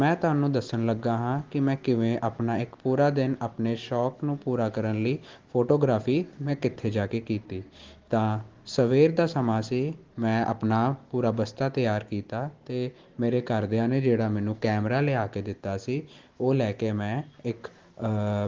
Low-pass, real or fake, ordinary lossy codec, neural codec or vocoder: 7.2 kHz; real; Opus, 16 kbps; none